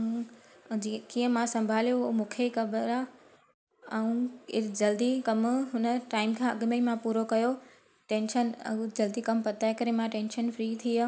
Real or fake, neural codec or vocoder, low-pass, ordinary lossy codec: real; none; none; none